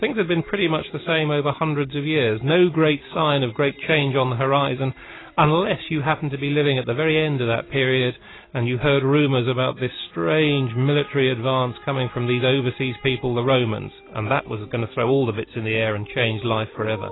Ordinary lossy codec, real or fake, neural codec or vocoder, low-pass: AAC, 16 kbps; real; none; 7.2 kHz